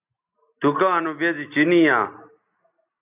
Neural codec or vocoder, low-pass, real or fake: none; 3.6 kHz; real